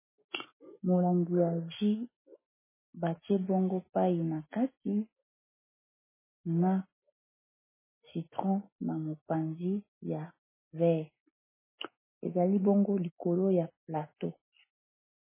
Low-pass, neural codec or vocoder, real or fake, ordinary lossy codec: 3.6 kHz; none; real; MP3, 16 kbps